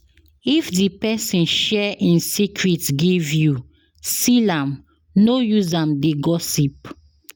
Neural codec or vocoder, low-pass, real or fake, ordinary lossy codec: none; none; real; none